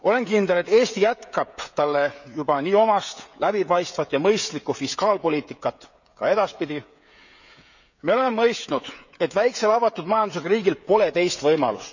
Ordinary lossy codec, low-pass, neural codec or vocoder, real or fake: none; 7.2 kHz; codec, 16 kHz, 16 kbps, FreqCodec, smaller model; fake